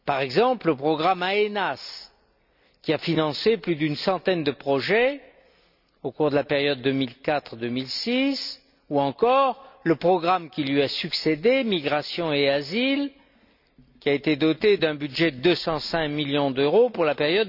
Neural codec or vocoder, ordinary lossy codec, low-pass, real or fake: none; none; 5.4 kHz; real